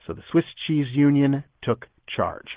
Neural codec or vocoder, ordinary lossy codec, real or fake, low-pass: none; Opus, 16 kbps; real; 3.6 kHz